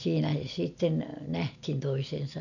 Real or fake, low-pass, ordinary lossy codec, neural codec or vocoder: real; 7.2 kHz; none; none